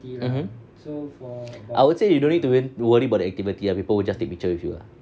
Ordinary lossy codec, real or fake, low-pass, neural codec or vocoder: none; real; none; none